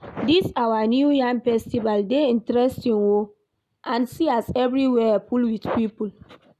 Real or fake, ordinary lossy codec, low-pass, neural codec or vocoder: real; none; 14.4 kHz; none